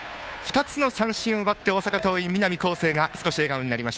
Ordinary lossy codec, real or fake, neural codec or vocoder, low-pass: none; fake; codec, 16 kHz, 2 kbps, FunCodec, trained on Chinese and English, 25 frames a second; none